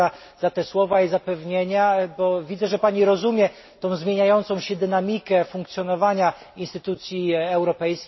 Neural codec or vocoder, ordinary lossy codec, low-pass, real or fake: none; MP3, 24 kbps; 7.2 kHz; real